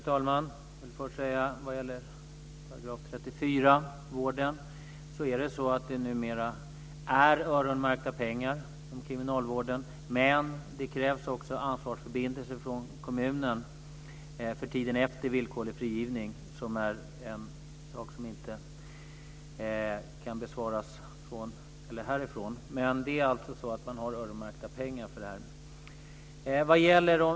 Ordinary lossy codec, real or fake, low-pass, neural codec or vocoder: none; real; none; none